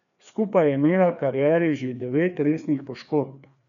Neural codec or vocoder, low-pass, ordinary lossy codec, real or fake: codec, 16 kHz, 2 kbps, FreqCodec, larger model; 7.2 kHz; none; fake